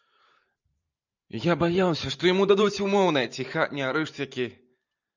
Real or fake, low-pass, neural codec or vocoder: fake; 7.2 kHz; vocoder, 44.1 kHz, 128 mel bands every 512 samples, BigVGAN v2